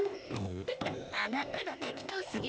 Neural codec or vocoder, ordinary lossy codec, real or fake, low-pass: codec, 16 kHz, 0.8 kbps, ZipCodec; none; fake; none